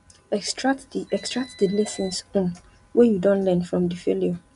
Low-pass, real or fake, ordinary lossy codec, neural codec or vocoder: 10.8 kHz; real; none; none